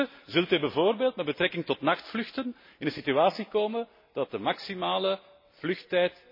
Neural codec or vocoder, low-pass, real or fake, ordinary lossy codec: none; 5.4 kHz; real; MP3, 24 kbps